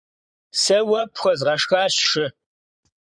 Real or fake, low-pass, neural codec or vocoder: fake; 9.9 kHz; vocoder, 22.05 kHz, 80 mel bands, Vocos